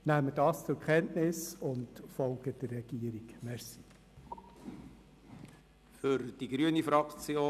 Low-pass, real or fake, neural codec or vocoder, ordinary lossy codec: 14.4 kHz; real; none; none